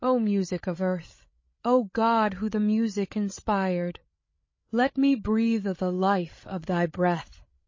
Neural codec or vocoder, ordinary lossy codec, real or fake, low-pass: codec, 16 kHz, 8 kbps, FreqCodec, larger model; MP3, 32 kbps; fake; 7.2 kHz